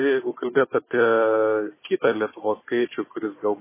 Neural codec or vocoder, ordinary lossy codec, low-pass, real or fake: codec, 16 kHz, 4 kbps, FunCodec, trained on Chinese and English, 50 frames a second; MP3, 16 kbps; 3.6 kHz; fake